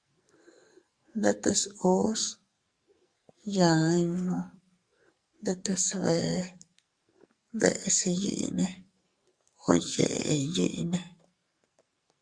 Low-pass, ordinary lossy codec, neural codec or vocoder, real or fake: 9.9 kHz; Opus, 64 kbps; codec, 44.1 kHz, 2.6 kbps, SNAC; fake